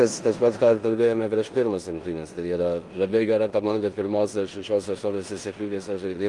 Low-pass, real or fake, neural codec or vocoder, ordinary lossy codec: 10.8 kHz; fake; codec, 16 kHz in and 24 kHz out, 0.9 kbps, LongCat-Audio-Codec, four codebook decoder; Opus, 24 kbps